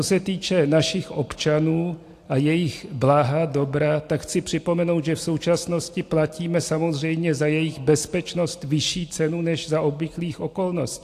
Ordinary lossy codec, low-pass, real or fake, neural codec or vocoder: AAC, 64 kbps; 14.4 kHz; real; none